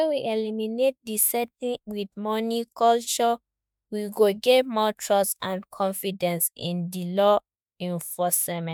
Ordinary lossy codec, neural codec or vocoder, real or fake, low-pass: none; autoencoder, 48 kHz, 32 numbers a frame, DAC-VAE, trained on Japanese speech; fake; none